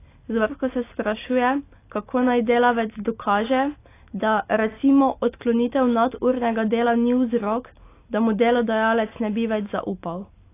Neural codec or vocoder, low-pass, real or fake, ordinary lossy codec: none; 3.6 kHz; real; AAC, 24 kbps